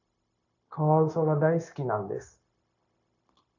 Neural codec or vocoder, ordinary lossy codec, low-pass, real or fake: codec, 16 kHz, 0.9 kbps, LongCat-Audio-Codec; AAC, 48 kbps; 7.2 kHz; fake